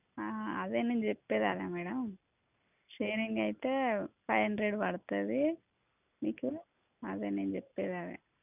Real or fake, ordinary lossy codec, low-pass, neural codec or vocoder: real; none; 3.6 kHz; none